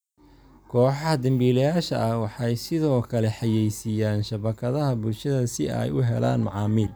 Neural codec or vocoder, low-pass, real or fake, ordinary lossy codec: none; none; real; none